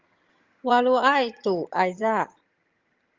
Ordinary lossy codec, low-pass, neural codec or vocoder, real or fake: Opus, 32 kbps; 7.2 kHz; vocoder, 22.05 kHz, 80 mel bands, HiFi-GAN; fake